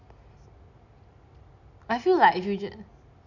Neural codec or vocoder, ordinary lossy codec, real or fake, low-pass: none; none; real; 7.2 kHz